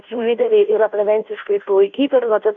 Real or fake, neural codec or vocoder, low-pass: fake; codec, 16 kHz, 0.5 kbps, FunCodec, trained on Chinese and English, 25 frames a second; 7.2 kHz